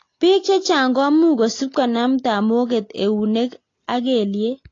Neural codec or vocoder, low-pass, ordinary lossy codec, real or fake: none; 7.2 kHz; AAC, 32 kbps; real